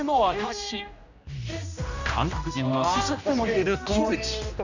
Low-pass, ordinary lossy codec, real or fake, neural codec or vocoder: 7.2 kHz; none; fake; codec, 16 kHz, 1 kbps, X-Codec, HuBERT features, trained on general audio